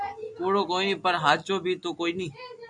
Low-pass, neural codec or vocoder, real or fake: 10.8 kHz; none; real